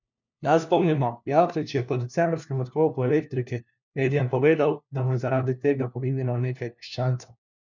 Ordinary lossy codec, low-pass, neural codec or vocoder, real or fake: none; 7.2 kHz; codec, 16 kHz, 1 kbps, FunCodec, trained on LibriTTS, 50 frames a second; fake